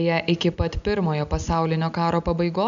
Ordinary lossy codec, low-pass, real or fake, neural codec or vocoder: MP3, 96 kbps; 7.2 kHz; real; none